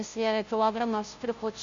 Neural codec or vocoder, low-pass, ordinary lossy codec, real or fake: codec, 16 kHz, 0.5 kbps, FunCodec, trained on Chinese and English, 25 frames a second; 7.2 kHz; MP3, 64 kbps; fake